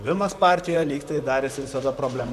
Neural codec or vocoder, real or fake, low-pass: vocoder, 44.1 kHz, 128 mel bands, Pupu-Vocoder; fake; 14.4 kHz